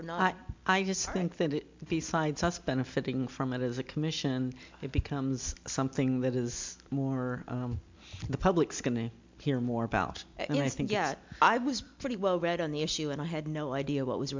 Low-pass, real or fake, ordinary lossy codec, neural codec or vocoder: 7.2 kHz; real; MP3, 64 kbps; none